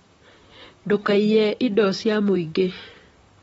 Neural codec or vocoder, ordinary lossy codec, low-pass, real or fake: vocoder, 44.1 kHz, 128 mel bands, Pupu-Vocoder; AAC, 24 kbps; 19.8 kHz; fake